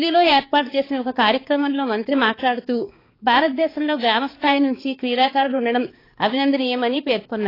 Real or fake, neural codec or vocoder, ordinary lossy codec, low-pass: fake; codec, 16 kHz, 4 kbps, X-Codec, HuBERT features, trained on balanced general audio; AAC, 24 kbps; 5.4 kHz